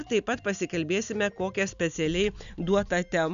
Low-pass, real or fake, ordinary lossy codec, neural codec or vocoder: 7.2 kHz; real; AAC, 96 kbps; none